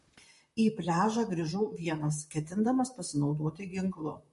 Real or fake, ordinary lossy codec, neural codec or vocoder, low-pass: fake; MP3, 48 kbps; vocoder, 44.1 kHz, 128 mel bands, Pupu-Vocoder; 14.4 kHz